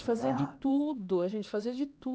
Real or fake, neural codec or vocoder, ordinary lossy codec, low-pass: fake; codec, 16 kHz, 2 kbps, X-Codec, HuBERT features, trained on LibriSpeech; none; none